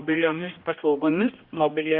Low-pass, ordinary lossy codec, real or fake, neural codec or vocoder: 5.4 kHz; Opus, 64 kbps; fake; codec, 16 kHz, 1 kbps, X-Codec, HuBERT features, trained on general audio